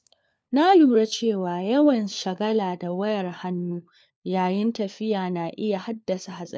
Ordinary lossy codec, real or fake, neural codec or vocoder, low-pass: none; fake; codec, 16 kHz, 4 kbps, FunCodec, trained on LibriTTS, 50 frames a second; none